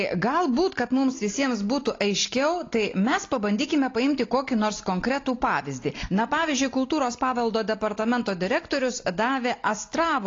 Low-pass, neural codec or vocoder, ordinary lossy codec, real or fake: 7.2 kHz; none; AAC, 32 kbps; real